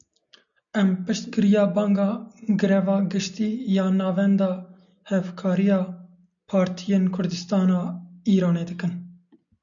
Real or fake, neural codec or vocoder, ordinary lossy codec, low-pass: real; none; MP3, 96 kbps; 7.2 kHz